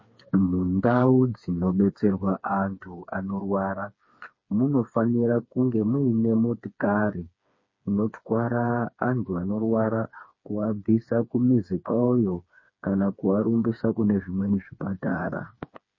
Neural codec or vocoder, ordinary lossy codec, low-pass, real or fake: codec, 16 kHz, 4 kbps, FreqCodec, smaller model; MP3, 32 kbps; 7.2 kHz; fake